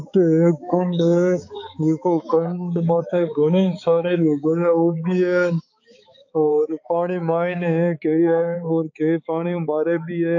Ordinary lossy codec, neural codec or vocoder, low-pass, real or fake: AAC, 48 kbps; codec, 16 kHz, 4 kbps, X-Codec, HuBERT features, trained on balanced general audio; 7.2 kHz; fake